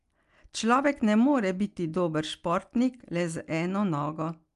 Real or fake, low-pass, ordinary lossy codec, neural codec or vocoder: real; 10.8 kHz; Opus, 32 kbps; none